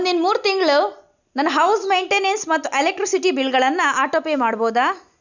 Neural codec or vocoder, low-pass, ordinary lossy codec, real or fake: none; 7.2 kHz; none; real